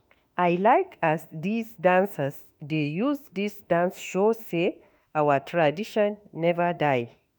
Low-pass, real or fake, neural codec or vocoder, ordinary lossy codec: none; fake; autoencoder, 48 kHz, 32 numbers a frame, DAC-VAE, trained on Japanese speech; none